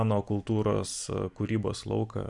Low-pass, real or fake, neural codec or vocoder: 10.8 kHz; real; none